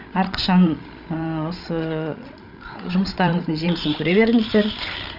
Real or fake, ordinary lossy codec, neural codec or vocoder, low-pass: fake; none; codec, 16 kHz, 16 kbps, FreqCodec, larger model; 5.4 kHz